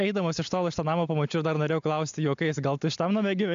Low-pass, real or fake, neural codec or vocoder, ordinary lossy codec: 7.2 kHz; real; none; MP3, 64 kbps